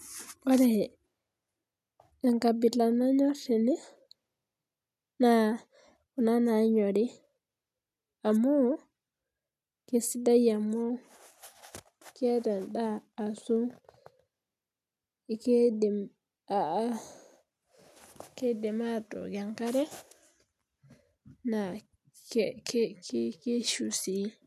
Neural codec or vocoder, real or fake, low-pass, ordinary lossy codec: none; real; 14.4 kHz; none